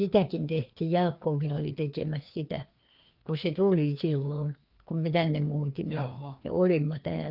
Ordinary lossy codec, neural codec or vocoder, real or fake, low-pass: Opus, 24 kbps; codec, 16 kHz, 2 kbps, FreqCodec, larger model; fake; 5.4 kHz